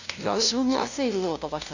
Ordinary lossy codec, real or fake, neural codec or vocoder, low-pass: none; fake; codec, 16 kHz, 0.5 kbps, FunCodec, trained on LibriTTS, 25 frames a second; 7.2 kHz